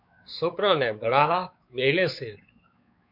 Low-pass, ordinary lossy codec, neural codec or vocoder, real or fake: 5.4 kHz; MP3, 48 kbps; codec, 16 kHz, 4 kbps, X-Codec, WavLM features, trained on Multilingual LibriSpeech; fake